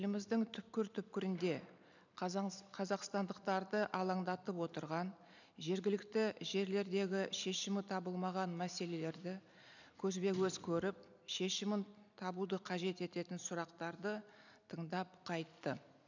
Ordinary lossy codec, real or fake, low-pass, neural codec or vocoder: none; fake; 7.2 kHz; vocoder, 44.1 kHz, 128 mel bands every 256 samples, BigVGAN v2